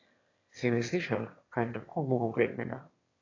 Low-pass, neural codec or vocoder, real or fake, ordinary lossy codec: 7.2 kHz; autoencoder, 22.05 kHz, a latent of 192 numbers a frame, VITS, trained on one speaker; fake; AAC, 32 kbps